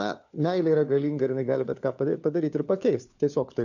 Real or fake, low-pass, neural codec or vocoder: fake; 7.2 kHz; codec, 16 kHz, 4 kbps, FunCodec, trained on LibriTTS, 50 frames a second